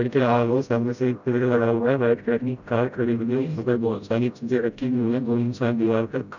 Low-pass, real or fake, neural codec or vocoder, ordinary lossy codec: 7.2 kHz; fake; codec, 16 kHz, 0.5 kbps, FreqCodec, smaller model; none